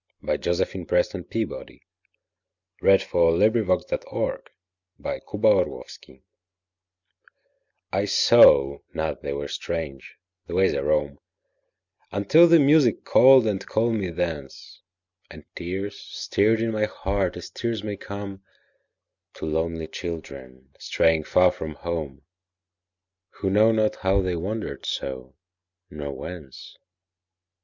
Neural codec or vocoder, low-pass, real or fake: none; 7.2 kHz; real